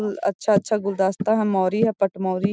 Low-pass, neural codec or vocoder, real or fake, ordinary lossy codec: none; none; real; none